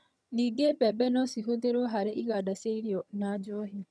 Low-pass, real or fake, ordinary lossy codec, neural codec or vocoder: none; fake; none; vocoder, 22.05 kHz, 80 mel bands, HiFi-GAN